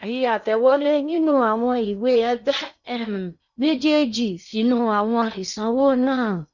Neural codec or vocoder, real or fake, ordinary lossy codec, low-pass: codec, 16 kHz in and 24 kHz out, 0.6 kbps, FocalCodec, streaming, 2048 codes; fake; none; 7.2 kHz